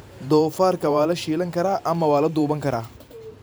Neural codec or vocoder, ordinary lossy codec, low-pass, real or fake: vocoder, 44.1 kHz, 128 mel bands every 512 samples, BigVGAN v2; none; none; fake